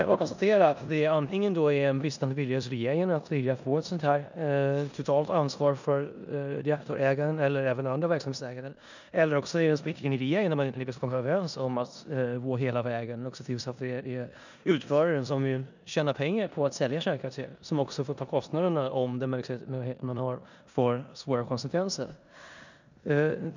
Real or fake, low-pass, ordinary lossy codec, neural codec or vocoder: fake; 7.2 kHz; none; codec, 16 kHz in and 24 kHz out, 0.9 kbps, LongCat-Audio-Codec, four codebook decoder